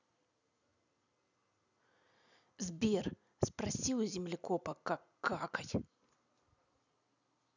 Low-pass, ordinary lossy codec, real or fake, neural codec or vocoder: 7.2 kHz; none; real; none